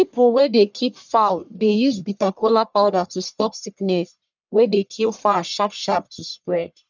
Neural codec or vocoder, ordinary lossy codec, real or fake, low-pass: codec, 44.1 kHz, 1.7 kbps, Pupu-Codec; none; fake; 7.2 kHz